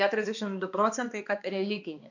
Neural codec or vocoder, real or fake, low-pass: codec, 16 kHz, 2 kbps, X-Codec, HuBERT features, trained on balanced general audio; fake; 7.2 kHz